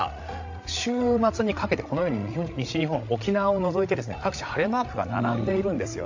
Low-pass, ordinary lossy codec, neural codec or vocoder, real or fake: 7.2 kHz; AAC, 48 kbps; codec, 16 kHz, 16 kbps, FreqCodec, larger model; fake